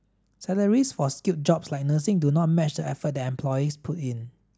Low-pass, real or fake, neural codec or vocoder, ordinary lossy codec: none; real; none; none